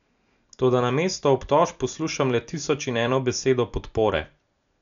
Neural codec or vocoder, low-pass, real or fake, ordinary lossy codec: none; 7.2 kHz; real; none